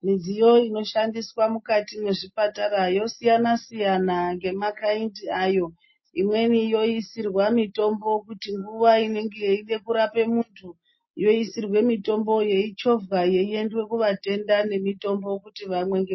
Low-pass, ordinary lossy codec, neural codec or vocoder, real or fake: 7.2 kHz; MP3, 24 kbps; none; real